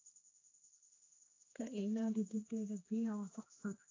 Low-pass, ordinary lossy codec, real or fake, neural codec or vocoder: 7.2 kHz; AAC, 32 kbps; fake; codec, 16 kHz, 2 kbps, X-Codec, HuBERT features, trained on general audio